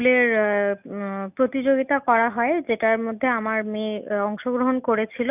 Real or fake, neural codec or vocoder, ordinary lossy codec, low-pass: real; none; none; 3.6 kHz